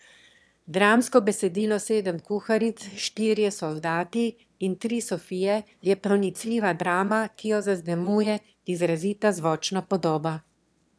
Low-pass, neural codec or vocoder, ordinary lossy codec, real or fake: none; autoencoder, 22.05 kHz, a latent of 192 numbers a frame, VITS, trained on one speaker; none; fake